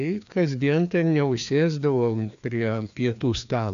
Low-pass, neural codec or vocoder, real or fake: 7.2 kHz; codec, 16 kHz, 2 kbps, FreqCodec, larger model; fake